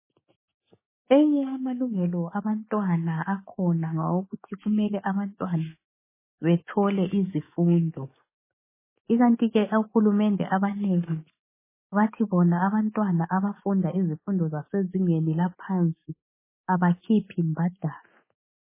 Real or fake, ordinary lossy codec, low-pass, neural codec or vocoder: fake; MP3, 16 kbps; 3.6 kHz; autoencoder, 48 kHz, 128 numbers a frame, DAC-VAE, trained on Japanese speech